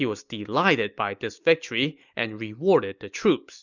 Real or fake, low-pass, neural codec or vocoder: real; 7.2 kHz; none